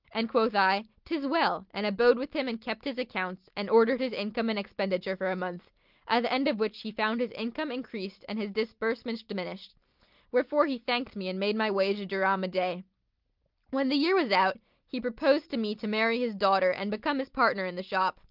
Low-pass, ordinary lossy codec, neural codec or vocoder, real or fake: 5.4 kHz; Opus, 32 kbps; none; real